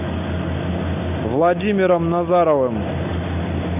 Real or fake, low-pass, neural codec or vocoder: real; 3.6 kHz; none